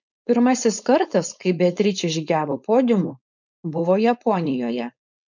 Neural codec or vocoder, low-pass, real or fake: codec, 16 kHz, 4.8 kbps, FACodec; 7.2 kHz; fake